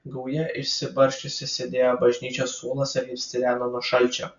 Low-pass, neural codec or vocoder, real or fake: 7.2 kHz; none; real